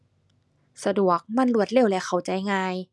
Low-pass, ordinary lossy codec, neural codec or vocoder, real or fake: none; none; none; real